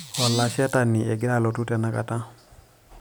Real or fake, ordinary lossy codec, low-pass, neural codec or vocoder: real; none; none; none